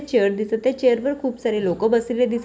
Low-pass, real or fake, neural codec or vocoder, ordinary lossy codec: none; real; none; none